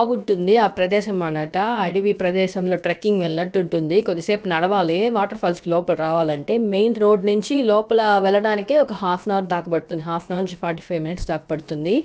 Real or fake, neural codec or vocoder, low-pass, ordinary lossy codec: fake; codec, 16 kHz, about 1 kbps, DyCAST, with the encoder's durations; none; none